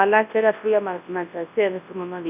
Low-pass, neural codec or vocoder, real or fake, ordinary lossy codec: 3.6 kHz; codec, 24 kHz, 0.9 kbps, WavTokenizer, large speech release; fake; none